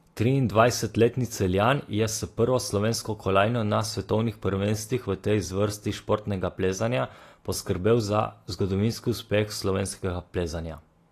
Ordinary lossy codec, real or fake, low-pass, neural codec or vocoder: AAC, 48 kbps; real; 14.4 kHz; none